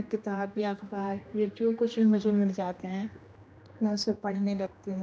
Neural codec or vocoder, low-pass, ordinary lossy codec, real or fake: codec, 16 kHz, 1 kbps, X-Codec, HuBERT features, trained on general audio; none; none; fake